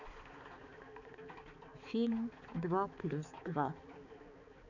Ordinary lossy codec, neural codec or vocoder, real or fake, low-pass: none; codec, 16 kHz, 4 kbps, X-Codec, HuBERT features, trained on balanced general audio; fake; 7.2 kHz